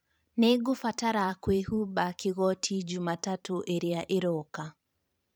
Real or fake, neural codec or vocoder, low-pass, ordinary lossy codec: fake; vocoder, 44.1 kHz, 128 mel bands every 512 samples, BigVGAN v2; none; none